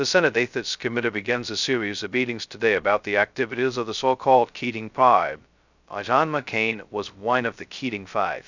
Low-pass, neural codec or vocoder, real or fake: 7.2 kHz; codec, 16 kHz, 0.2 kbps, FocalCodec; fake